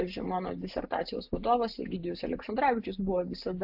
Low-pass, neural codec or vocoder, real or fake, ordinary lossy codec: 5.4 kHz; none; real; AAC, 48 kbps